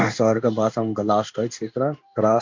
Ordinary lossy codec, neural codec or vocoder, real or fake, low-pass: MP3, 64 kbps; codec, 16 kHz in and 24 kHz out, 1 kbps, XY-Tokenizer; fake; 7.2 kHz